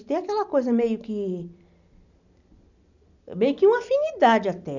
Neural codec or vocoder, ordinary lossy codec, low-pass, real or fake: none; none; 7.2 kHz; real